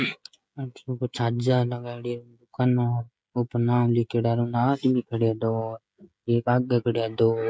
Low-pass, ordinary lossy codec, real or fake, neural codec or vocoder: none; none; fake; codec, 16 kHz, 16 kbps, FreqCodec, smaller model